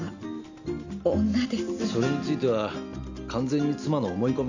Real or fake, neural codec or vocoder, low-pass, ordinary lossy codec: real; none; 7.2 kHz; none